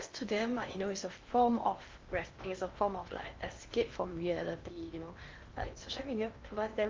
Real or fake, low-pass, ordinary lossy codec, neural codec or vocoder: fake; 7.2 kHz; Opus, 32 kbps; codec, 16 kHz in and 24 kHz out, 0.8 kbps, FocalCodec, streaming, 65536 codes